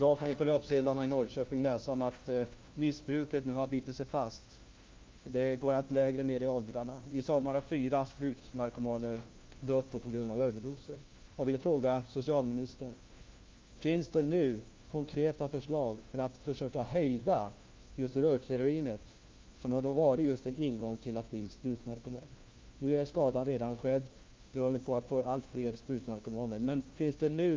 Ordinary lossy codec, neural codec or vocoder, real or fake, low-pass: Opus, 24 kbps; codec, 16 kHz, 1 kbps, FunCodec, trained on LibriTTS, 50 frames a second; fake; 7.2 kHz